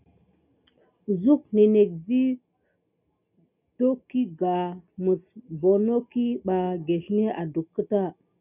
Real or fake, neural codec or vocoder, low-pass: real; none; 3.6 kHz